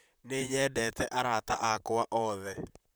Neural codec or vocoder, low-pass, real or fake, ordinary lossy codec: vocoder, 44.1 kHz, 128 mel bands, Pupu-Vocoder; none; fake; none